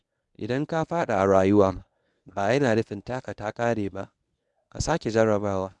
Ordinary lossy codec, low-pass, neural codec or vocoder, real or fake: none; 10.8 kHz; codec, 24 kHz, 0.9 kbps, WavTokenizer, medium speech release version 1; fake